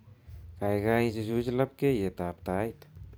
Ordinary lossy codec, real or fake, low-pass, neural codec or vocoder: none; fake; none; vocoder, 44.1 kHz, 128 mel bands every 512 samples, BigVGAN v2